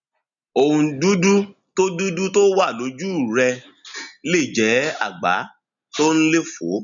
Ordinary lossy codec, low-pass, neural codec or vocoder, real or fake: none; 7.2 kHz; none; real